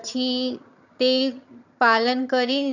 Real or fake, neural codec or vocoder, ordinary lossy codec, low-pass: fake; vocoder, 22.05 kHz, 80 mel bands, HiFi-GAN; none; 7.2 kHz